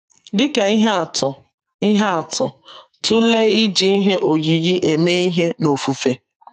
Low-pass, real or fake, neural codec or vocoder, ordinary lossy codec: 14.4 kHz; fake; codec, 44.1 kHz, 2.6 kbps, SNAC; none